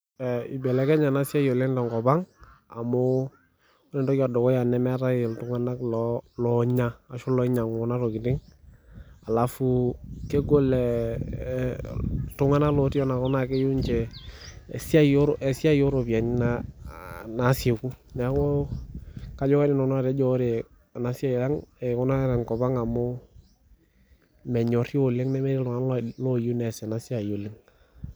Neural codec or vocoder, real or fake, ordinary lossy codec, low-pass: none; real; none; none